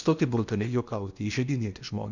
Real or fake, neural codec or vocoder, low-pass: fake; codec, 16 kHz in and 24 kHz out, 0.8 kbps, FocalCodec, streaming, 65536 codes; 7.2 kHz